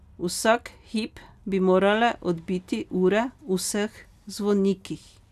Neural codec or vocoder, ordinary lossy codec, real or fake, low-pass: none; none; real; 14.4 kHz